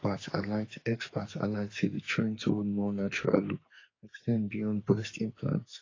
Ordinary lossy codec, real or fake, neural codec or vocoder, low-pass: AAC, 32 kbps; fake; codec, 44.1 kHz, 2.6 kbps, SNAC; 7.2 kHz